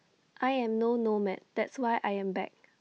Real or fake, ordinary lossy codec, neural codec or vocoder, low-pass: real; none; none; none